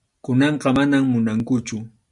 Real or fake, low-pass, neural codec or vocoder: real; 10.8 kHz; none